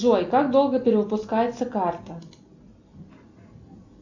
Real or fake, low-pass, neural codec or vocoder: real; 7.2 kHz; none